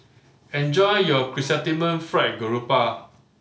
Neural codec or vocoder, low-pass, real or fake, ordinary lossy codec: none; none; real; none